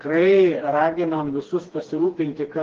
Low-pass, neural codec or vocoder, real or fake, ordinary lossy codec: 7.2 kHz; codec, 16 kHz, 2 kbps, FreqCodec, smaller model; fake; Opus, 16 kbps